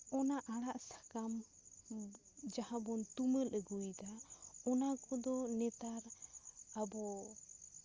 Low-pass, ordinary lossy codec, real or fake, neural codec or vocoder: 7.2 kHz; Opus, 32 kbps; real; none